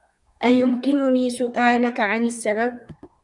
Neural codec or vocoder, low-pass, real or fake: codec, 24 kHz, 1 kbps, SNAC; 10.8 kHz; fake